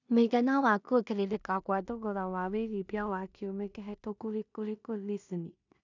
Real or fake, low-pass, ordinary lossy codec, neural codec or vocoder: fake; 7.2 kHz; none; codec, 16 kHz in and 24 kHz out, 0.4 kbps, LongCat-Audio-Codec, two codebook decoder